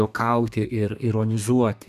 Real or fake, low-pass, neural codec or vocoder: fake; 14.4 kHz; codec, 32 kHz, 1.9 kbps, SNAC